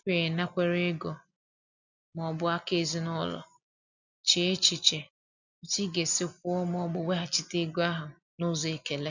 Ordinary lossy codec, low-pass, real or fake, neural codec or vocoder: none; 7.2 kHz; real; none